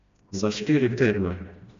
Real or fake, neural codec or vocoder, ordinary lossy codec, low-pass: fake; codec, 16 kHz, 1 kbps, FreqCodec, smaller model; AAC, 64 kbps; 7.2 kHz